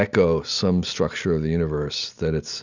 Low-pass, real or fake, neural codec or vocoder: 7.2 kHz; real; none